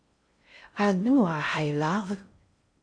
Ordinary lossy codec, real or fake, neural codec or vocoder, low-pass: AAC, 48 kbps; fake; codec, 16 kHz in and 24 kHz out, 0.6 kbps, FocalCodec, streaming, 4096 codes; 9.9 kHz